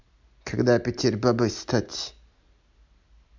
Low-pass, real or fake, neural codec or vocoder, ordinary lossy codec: 7.2 kHz; real; none; MP3, 64 kbps